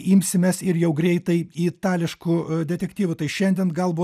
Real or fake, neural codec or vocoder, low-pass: real; none; 14.4 kHz